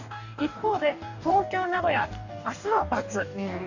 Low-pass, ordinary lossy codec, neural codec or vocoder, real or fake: 7.2 kHz; none; codec, 44.1 kHz, 2.6 kbps, DAC; fake